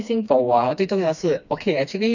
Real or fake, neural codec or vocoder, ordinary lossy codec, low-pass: fake; codec, 16 kHz, 2 kbps, FreqCodec, smaller model; none; 7.2 kHz